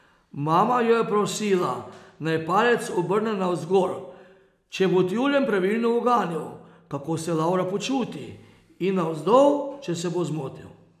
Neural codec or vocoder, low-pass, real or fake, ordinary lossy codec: none; 14.4 kHz; real; none